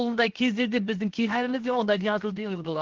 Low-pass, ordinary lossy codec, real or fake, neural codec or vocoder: 7.2 kHz; Opus, 16 kbps; fake; codec, 16 kHz, 0.7 kbps, FocalCodec